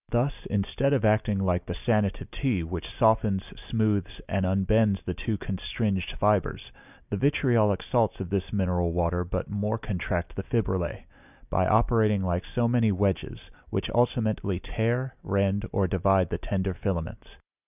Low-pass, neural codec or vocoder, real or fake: 3.6 kHz; none; real